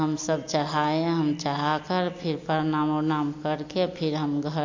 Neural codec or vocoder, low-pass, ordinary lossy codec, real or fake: none; 7.2 kHz; MP3, 48 kbps; real